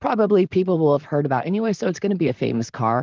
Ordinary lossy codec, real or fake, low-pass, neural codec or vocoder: Opus, 24 kbps; fake; 7.2 kHz; vocoder, 44.1 kHz, 128 mel bands, Pupu-Vocoder